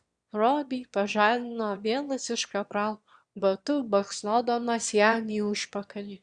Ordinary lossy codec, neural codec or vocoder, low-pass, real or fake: Opus, 64 kbps; autoencoder, 22.05 kHz, a latent of 192 numbers a frame, VITS, trained on one speaker; 9.9 kHz; fake